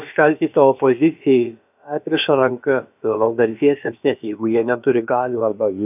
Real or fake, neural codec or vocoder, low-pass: fake; codec, 16 kHz, about 1 kbps, DyCAST, with the encoder's durations; 3.6 kHz